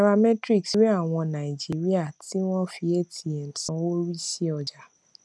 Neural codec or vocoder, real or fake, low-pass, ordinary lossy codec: none; real; none; none